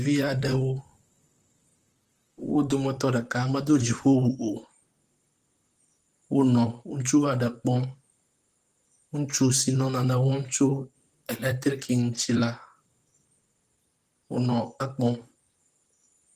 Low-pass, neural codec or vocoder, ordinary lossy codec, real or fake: 14.4 kHz; vocoder, 44.1 kHz, 128 mel bands, Pupu-Vocoder; Opus, 24 kbps; fake